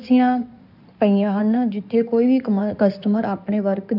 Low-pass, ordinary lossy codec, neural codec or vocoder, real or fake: 5.4 kHz; none; codec, 16 kHz in and 24 kHz out, 2.2 kbps, FireRedTTS-2 codec; fake